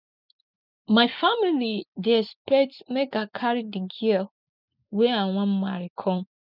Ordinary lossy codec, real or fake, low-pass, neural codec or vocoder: none; real; 5.4 kHz; none